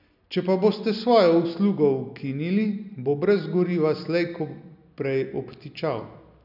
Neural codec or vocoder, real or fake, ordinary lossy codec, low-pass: none; real; none; 5.4 kHz